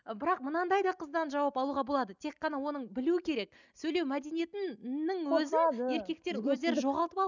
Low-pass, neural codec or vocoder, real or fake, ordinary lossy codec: 7.2 kHz; none; real; none